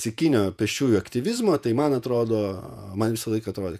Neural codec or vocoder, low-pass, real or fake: vocoder, 48 kHz, 128 mel bands, Vocos; 14.4 kHz; fake